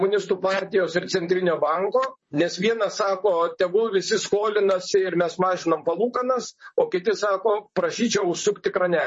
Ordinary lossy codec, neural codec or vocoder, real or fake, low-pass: MP3, 32 kbps; vocoder, 44.1 kHz, 128 mel bands, Pupu-Vocoder; fake; 10.8 kHz